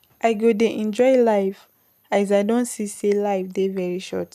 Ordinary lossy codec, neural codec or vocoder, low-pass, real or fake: none; none; 14.4 kHz; real